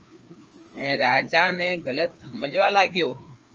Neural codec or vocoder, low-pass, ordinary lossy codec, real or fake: codec, 16 kHz, 2 kbps, FreqCodec, larger model; 7.2 kHz; Opus, 24 kbps; fake